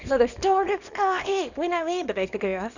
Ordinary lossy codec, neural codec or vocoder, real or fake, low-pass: none; codec, 24 kHz, 0.9 kbps, WavTokenizer, small release; fake; 7.2 kHz